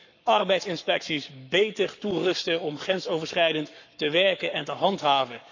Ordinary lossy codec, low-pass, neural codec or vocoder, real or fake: none; 7.2 kHz; codec, 44.1 kHz, 7.8 kbps, Pupu-Codec; fake